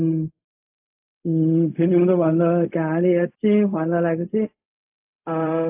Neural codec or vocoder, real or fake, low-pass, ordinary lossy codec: codec, 16 kHz, 0.4 kbps, LongCat-Audio-Codec; fake; 3.6 kHz; AAC, 32 kbps